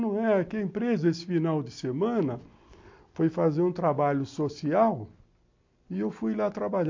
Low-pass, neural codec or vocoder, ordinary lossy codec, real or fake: 7.2 kHz; none; MP3, 48 kbps; real